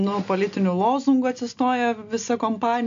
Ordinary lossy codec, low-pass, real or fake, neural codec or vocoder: AAC, 64 kbps; 7.2 kHz; real; none